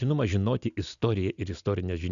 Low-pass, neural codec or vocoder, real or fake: 7.2 kHz; none; real